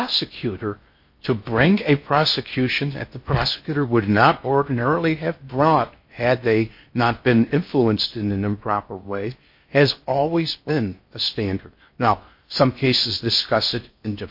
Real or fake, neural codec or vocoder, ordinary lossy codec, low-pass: fake; codec, 16 kHz in and 24 kHz out, 0.6 kbps, FocalCodec, streaming, 4096 codes; MP3, 32 kbps; 5.4 kHz